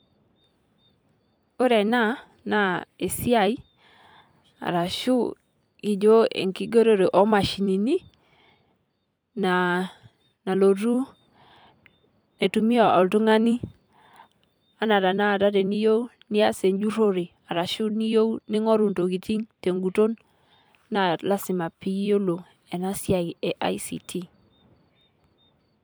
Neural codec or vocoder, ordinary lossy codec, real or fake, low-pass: vocoder, 44.1 kHz, 128 mel bands every 256 samples, BigVGAN v2; none; fake; none